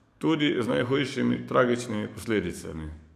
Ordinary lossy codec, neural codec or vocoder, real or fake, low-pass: none; codec, 44.1 kHz, 7.8 kbps, DAC; fake; 14.4 kHz